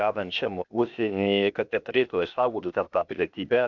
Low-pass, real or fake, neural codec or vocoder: 7.2 kHz; fake; codec, 16 kHz, 0.8 kbps, ZipCodec